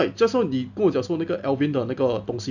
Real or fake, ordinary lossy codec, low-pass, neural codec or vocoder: real; none; 7.2 kHz; none